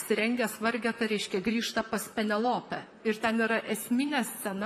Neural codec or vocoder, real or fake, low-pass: vocoder, 44.1 kHz, 128 mel bands, Pupu-Vocoder; fake; 14.4 kHz